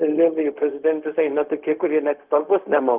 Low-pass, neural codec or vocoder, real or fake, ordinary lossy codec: 3.6 kHz; codec, 16 kHz, 0.4 kbps, LongCat-Audio-Codec; fake; Opus, 32 kbps